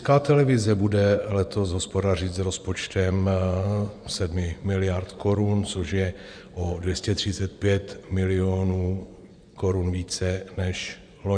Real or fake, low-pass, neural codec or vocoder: fake; 9.9 kHz; vocoder, 24 kHz, 100 mel bands, Vocos